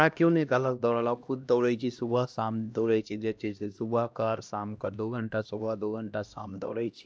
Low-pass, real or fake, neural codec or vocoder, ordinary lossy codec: none; fake; codec, 16 kHz, 1 kbps, X-Codec, HuBERT features, trained on LibriSpeech; none